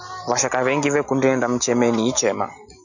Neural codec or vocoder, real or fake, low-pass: none; real; 7.2 kHz